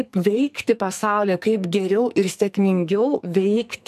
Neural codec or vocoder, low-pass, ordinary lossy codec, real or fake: codec, 44.1 kHz, 2.6 kbps, SNAC; 14.4 kHz; AAC, 96 kbps; fake